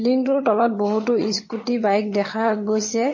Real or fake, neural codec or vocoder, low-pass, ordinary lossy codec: fake; vocoder, 22.05 kHz, 80 mel bands, HiFi-GAN; 7.2 kHz; MP3, 32 kbps